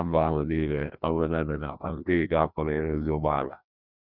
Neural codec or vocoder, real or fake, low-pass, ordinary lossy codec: codec, 16 kHz, 1 kbps, FreqCodec, larger model; fake; 5.4 kHz; none